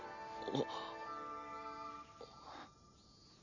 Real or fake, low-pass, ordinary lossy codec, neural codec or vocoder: real; 7.2 kHz; none; none